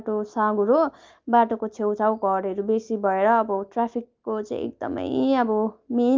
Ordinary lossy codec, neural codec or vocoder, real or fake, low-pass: Opus, 32 kbps; none; real; 7.2 kHz